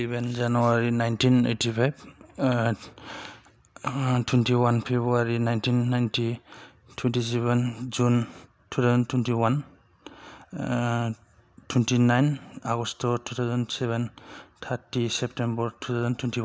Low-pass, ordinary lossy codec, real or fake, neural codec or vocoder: none; none; real; none